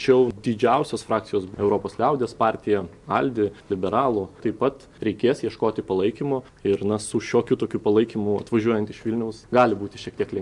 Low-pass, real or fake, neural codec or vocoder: 10.8 kHz; real; none